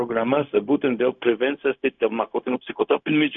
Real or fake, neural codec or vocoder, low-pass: fake; codec, 16 kHz, 0.4 kbps, LongCat-Audio-Codec; 7.2 kHz